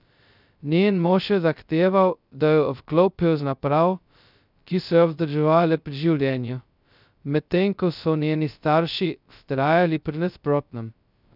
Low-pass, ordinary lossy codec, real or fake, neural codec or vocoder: 5.4 kHz; none; fake; codec, 16 kHz, 0.2 kbps, FocalCodec